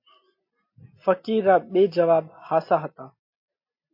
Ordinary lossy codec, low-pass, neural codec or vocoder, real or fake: MP3, 32 kbps; 5.4 kHz; none; real